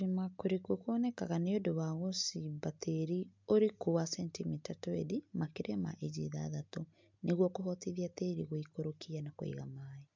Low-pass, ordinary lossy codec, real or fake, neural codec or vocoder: 7.2 kHz; MP3, 64 kbps; real; none